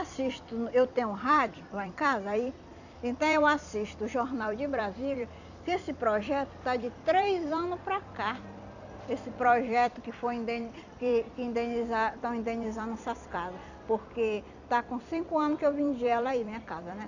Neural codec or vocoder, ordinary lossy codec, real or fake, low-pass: vocoder, 44.1 kHz, 128 mel bands every 256 samples, BigVGAN v2; none; fake; 7.2 kHz